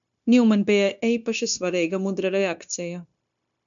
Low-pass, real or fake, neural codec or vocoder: 7.2 kHz; fake; codec, 16 kHz, 0.9 kbps, LongCat-Audio-Codec